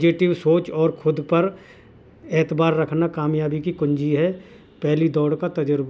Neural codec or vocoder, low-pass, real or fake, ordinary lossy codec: none; none; real; none